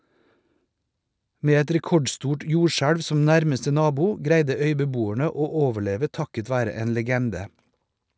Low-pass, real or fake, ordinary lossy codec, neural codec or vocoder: none; real; none; none